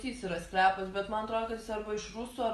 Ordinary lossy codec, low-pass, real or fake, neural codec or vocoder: Opus, 32 kbps; 10.8 kHz; real; none